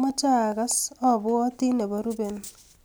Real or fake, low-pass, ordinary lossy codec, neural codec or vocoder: real; none; none; none